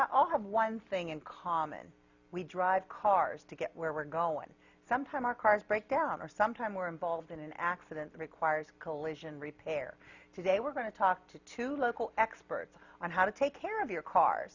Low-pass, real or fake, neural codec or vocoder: 7.2 kHz; real; none